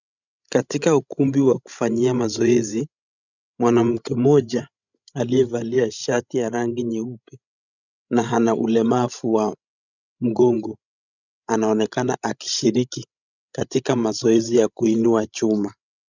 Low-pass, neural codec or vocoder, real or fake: 7.2 kHz; codec, 16 kHz, 16 kbps, FreqCodec, larger model; fake